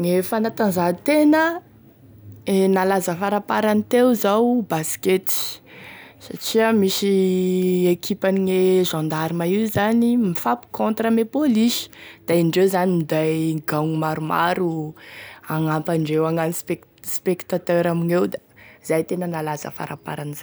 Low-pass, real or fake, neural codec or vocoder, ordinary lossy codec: none; real; none; none